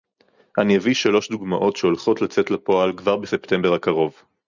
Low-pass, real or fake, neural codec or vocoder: 7.2 kHz; real; none